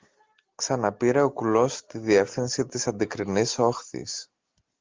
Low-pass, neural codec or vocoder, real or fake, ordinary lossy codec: 7.2 kHz; none; real; Opus, 24 kbps